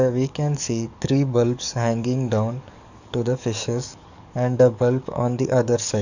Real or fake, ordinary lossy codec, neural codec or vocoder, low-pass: fake; none; codec, 44.1 kHz, 7.8 kbps, DAC; 7.2 kHz